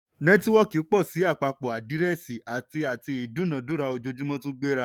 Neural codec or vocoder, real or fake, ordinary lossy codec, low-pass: codec, 44.1 kHz, 7.8 kbps, DAC; fake; none; 19.8 kHz